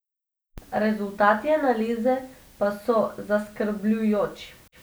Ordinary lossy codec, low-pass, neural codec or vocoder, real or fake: none; none; none; real